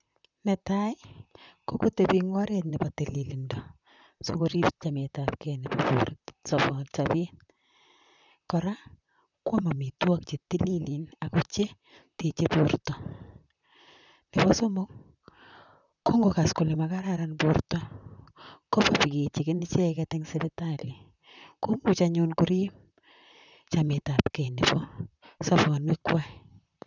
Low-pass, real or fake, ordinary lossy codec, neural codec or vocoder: 7.2 kHz; fake; none; vocoder, 44.1 kHz, 128 mel bands, Pupu-Vocoder